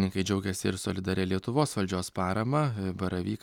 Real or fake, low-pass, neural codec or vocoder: real; 19.8 kHz; none